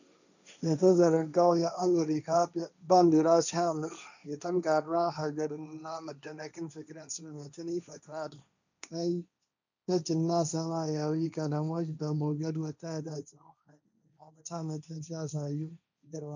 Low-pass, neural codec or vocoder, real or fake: 7.2 kHz; codec, 16 kHz, 1.1 kbps, Voila-Tokenizer; fake